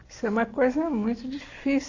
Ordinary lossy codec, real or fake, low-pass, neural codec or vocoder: AAC, 32 kbps; real; 7.2 kHz; none